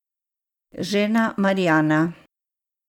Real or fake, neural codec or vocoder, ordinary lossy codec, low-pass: real; none; MP3, 96 kbps; 19.8 kHz